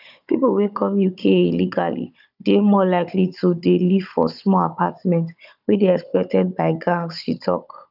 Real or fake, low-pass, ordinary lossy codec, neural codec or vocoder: fake; 5.4 kHz; none; codec, 16 kHz, 16 kbps, FunCodec, trained on Chinese and English, 50 frames a second